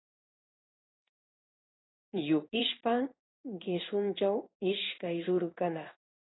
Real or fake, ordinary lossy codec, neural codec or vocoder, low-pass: fake; AAC, 16 kbps; codec, 16 kHz in and 24 kHz out, 1 kbps, XY-Tokenizer; 7.2 kHz